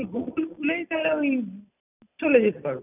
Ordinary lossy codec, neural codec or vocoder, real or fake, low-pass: none; none; real; 3.6 kHz